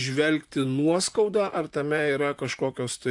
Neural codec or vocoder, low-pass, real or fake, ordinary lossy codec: vocoder, 44.1 kHz, 128 mel bands, Pupu-Vocoder; 10.8 kHz; fake; AAC, 64 kbps